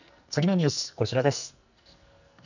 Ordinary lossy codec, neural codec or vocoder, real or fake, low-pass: none; codec, 44.1 kHz, 2.6 kbps, SNAC; fake; 7.2 kHz